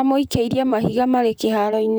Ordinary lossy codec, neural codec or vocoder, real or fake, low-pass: none; vocoder, 44.1 kHz, 128 mel bands, Pupu-Vocoder; fake; none